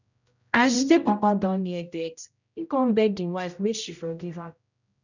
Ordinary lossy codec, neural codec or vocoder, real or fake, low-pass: none; codec, 16 kHz, 0.5 kbps, X-Codec, HuBERT features, trained on general audio; fake; 7.2 kHz